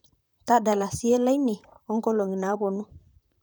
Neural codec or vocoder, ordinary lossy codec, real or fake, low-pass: vocoder, 44.1 kHz, 128 mel bands, Pupu-Vocoder; none; fake; none